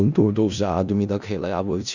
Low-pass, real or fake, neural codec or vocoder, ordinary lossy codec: 7.2 kHz; fake; codec, 16 kHz in and 24 kHz out, 0.4 kbps, LongCat-Audio-Codec, four codebook decoder; none